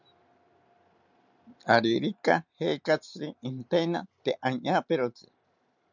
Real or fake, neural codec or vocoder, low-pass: real; none; 7.2 kHz